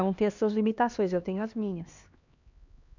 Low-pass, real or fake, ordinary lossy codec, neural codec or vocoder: 7.2 kHz; fake; none; codec, 16 kHz, 2 kbps, X-Codec, HuBERT features, trained on LibriSpeech